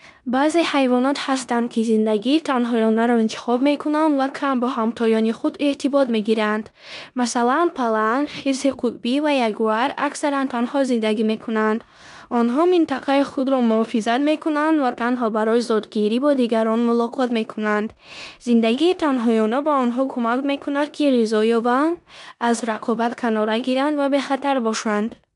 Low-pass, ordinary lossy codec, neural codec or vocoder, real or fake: 10.8 kHz; none; codec, 16 kHz in and 24 kHz out, 0.9 kbps, LongCat-Audio-Codec, four codebook decoder; fake